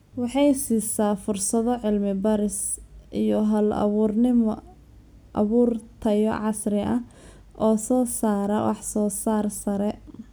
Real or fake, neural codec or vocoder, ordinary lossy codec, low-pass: real; none; none; none